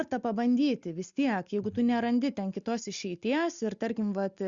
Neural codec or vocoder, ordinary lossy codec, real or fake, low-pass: none; Opus, 64 kbps; real; 7.2 kHz